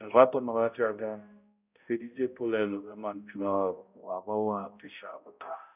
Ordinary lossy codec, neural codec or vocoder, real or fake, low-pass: none; codec, 16 kHz, 0.5 kbps, X-Codec, HuBERT features, trained on balanced general audio; fake; 3.6 kHz